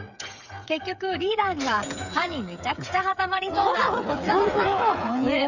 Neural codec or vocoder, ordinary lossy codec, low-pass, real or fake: codec, 16 kHz, 8 kbps, FreqCodec, smaller model; none; 7.2 kHz; fake